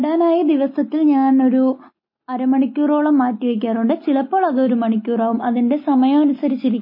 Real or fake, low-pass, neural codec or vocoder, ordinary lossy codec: real; 5.4 kHz; none; MP3, 24 kbps